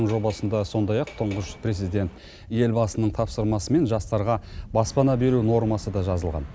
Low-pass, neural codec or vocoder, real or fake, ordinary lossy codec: none; none; real; none